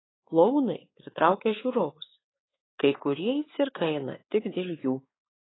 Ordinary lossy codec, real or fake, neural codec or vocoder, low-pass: AAC, 16 kbps; fake; codec, 16 kHz, 4 kbps, X-Codec, WavLM features, trained on Multilingual LibriSpeech; 7.2 kHz